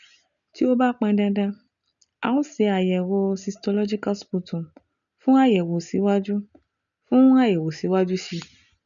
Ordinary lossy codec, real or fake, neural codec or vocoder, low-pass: none; real; none; 7.2 kHz